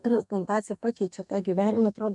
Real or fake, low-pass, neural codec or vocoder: fake; 10.8 kHz; codec, 32 kHz, 1.9 kbps, SNAC